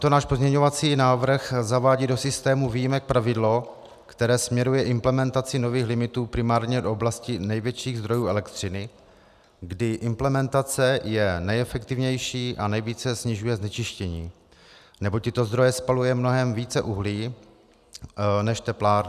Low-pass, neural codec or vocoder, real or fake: 14.4 kHz; none; real